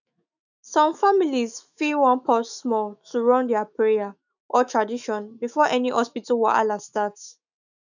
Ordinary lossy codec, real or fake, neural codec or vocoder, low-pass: none; fake; autoencoder, 48 kHz, 128 numbers a frame, DAC-VAE, trained on Japanese speech; 7.2 kHz